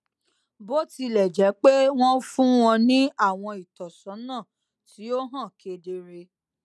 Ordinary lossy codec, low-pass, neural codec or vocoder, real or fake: none; none; none; real